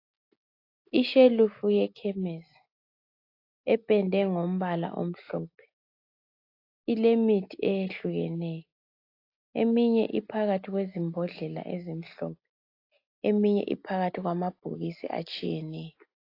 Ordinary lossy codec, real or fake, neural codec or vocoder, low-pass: AAC, 32 kbps; real; none; 5.4 kHz